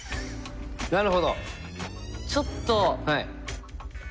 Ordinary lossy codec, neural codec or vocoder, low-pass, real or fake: none; none; none; real